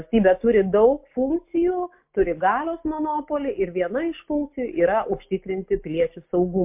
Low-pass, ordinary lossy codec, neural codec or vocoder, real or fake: 3.6 kHz; MP3, 32 kbps; none; real